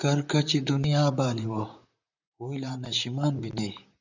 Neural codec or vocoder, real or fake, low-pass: vocoder, 44.1 kHz, 128 mel bands, Pupu-Vocoder; fake; 7.2 kHz